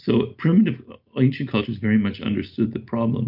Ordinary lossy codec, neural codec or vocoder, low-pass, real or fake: MP3, 48 kbps; none; 5.4 kHz; real